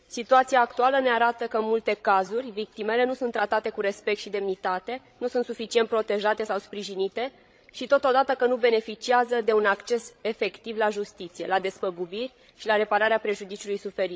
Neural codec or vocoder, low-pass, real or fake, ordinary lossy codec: codec, 16 kHz, 16 kbps, FreqCodec, larger model; none; fake; none